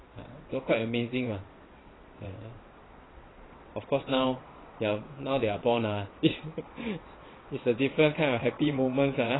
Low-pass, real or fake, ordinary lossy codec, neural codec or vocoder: 7.2 kHz; fake; AAC, 16 kbps; vocoder, 22.05 kHz, 80 mel bands, WaveNeXt